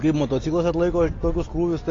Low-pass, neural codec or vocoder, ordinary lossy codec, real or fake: 7.2 kHz; none; AAC, 32 kbps; real